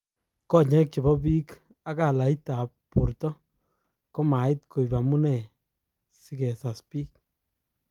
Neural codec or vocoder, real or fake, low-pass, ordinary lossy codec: none; real; 19.8 kHz; Opus, 32 kbps